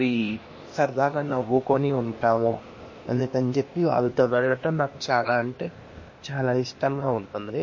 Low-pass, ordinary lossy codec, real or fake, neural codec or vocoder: 7.2 kHz; MP3, 32 kbps; fake; codec, 16 kHz, 0.8 kbps, ZipCodec